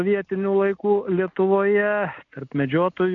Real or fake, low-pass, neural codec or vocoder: real; 7.2 kHz; none